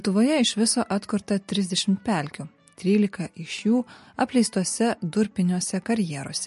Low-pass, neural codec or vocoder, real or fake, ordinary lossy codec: 14.4 kHz; none; real; MP3, 48 kbps